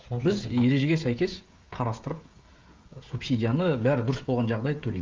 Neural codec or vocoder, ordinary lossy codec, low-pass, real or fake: codec, 16 kHz, 16 kbps, FreqCodec, smaller model; Opus, 24 kbps; 7.2 kHz; fake